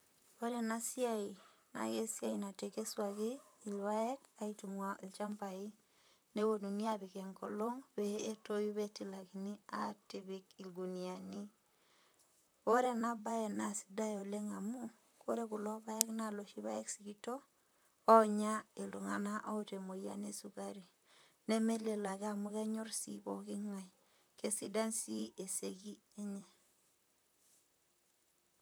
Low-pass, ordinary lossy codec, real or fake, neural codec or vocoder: none; none; fake; vocoder, 44.1 kHz, 128 mel bands, Pupu-Vocoder